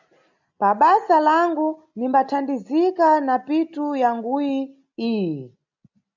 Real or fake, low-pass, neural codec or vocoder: real; 7.2 kHz; none